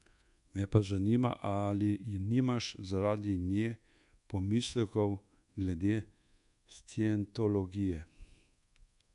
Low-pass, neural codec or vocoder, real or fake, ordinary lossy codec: 10.8 kHz; codec, 24 kHz, 1.2 kbps, DualCodec; fake; none